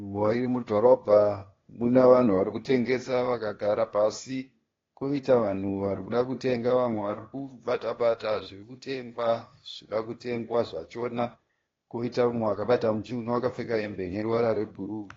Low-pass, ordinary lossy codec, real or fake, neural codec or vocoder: 7.2 kHz; AAC, 32 kbps; fake; codec, 16 kHz, 0.8 kbps, ZipCodec